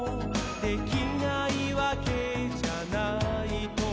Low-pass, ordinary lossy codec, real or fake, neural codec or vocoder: none; none; real; none